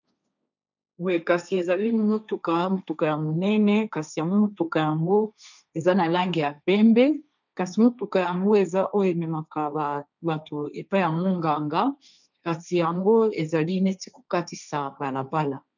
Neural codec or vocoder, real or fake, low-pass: codec, 16 kHz, 1.1 kbps, Voila-Tokenizer; fake; 7.2 kHz